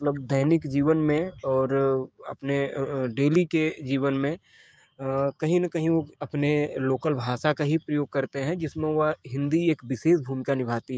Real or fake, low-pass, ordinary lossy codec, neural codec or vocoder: fake; none; none; codec, 16 kHz, 6 kbps, DAC